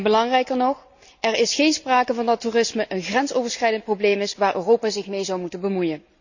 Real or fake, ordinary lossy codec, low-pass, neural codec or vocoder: real; none; 7.2 kHz; none